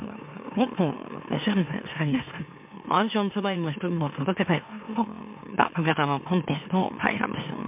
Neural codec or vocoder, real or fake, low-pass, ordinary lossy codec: autoencoder, 44.1 kHz, a latent of 192 numbers a frame, MeloTTS; fake; 3.6 kHz; MP3, 32 kbps